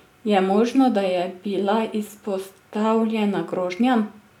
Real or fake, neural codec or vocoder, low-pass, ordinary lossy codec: real; none; 19.8 kHz; none